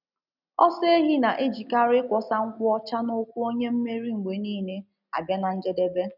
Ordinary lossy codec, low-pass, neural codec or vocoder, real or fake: none; 5.4 kHz; none; real